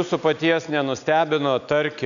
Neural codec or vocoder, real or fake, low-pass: none; real; 7.2 kHz